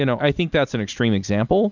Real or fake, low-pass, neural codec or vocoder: real; 7.2 kHz; none